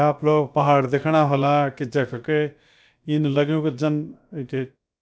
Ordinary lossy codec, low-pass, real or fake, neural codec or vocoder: none; none; fake; codec, 16 kHz, about 1 kbps, DyCAST, with the encoder's durations